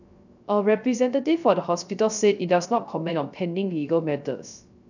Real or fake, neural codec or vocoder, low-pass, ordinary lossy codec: fake; codec, 16 kHz, 0.3 kbps, FocalCodec; 7.2 kHz; none